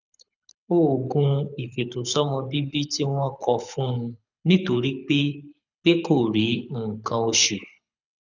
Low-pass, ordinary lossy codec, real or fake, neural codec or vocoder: 7.2 kHz; none; fake; codec, 24 kHz, 6 kbps, HILCodec